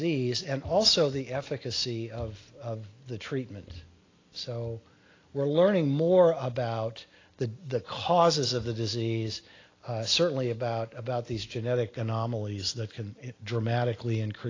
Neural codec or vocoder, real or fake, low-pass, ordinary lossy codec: none; real; 7.2 kHz; AAC, 32 kbps